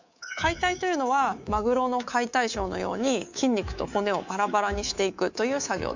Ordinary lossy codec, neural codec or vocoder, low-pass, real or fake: Opus, 64 kbps; codec, 24 kHz, 3.1 kbps, DualCodec; 7.2 kHz; fake